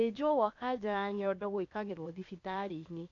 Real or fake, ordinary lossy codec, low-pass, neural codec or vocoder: fake; AAC, 48 kbps; 7.2 kHz; codec, 16 kHz, 0.8 kbps, ZipCodec